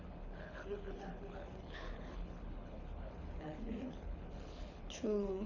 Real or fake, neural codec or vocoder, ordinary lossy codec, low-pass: fake; codec, 24 kHz, 6 kbps, HILCodec; none; 7.2 kHz